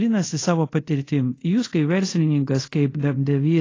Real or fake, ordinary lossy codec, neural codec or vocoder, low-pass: fake; AAC, 32 kbps; codec, 24 kHz, 0.5 kbps, DualCodec; 7.2 kHz